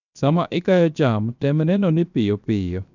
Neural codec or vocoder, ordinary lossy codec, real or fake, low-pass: codec, 16 kHz, 0.7 kbps, FocalCodec; none; fake; 7.2 kHz